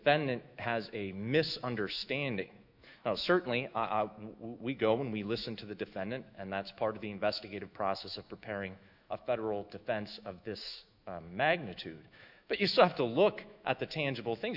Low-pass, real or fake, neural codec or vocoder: 5.4 kHz; fake; autoencoder, 48 kHz, 128 numbers a frame, DAC-VAE, trained on Japanese speech